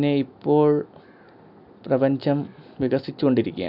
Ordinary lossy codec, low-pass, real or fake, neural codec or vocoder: none; 5.4 kHz; real; none